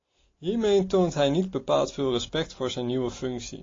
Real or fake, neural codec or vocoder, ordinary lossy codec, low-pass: real; none; AAC, 32 kbps; 7.2 kHz